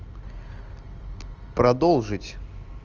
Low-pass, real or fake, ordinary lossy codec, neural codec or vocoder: 7.2 kHz; real; Opus, 24 kbps; none